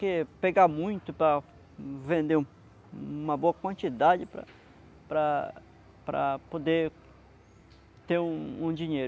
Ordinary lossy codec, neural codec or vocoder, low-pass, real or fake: none; none; none; real